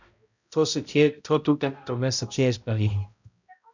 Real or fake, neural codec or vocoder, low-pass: fake; codec, 16 kHz, 0.5 kbps, X-Codec, HuBERT features, trained on balanced general audio; 7.2 kHz